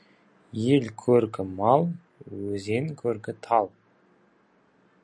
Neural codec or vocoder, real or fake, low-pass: none; real; 9.9 kHz